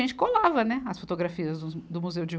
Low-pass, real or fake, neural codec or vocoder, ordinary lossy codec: none; real; none; none